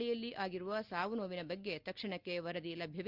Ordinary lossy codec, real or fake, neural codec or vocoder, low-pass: Opus, 24 kbps; real; none; 5.4 kHz